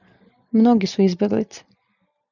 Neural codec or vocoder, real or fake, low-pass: none; real; 7.2 kHz